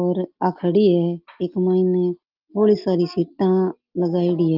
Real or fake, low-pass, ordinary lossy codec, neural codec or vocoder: real; 5.4 kHz; Opus, 32 kbps; none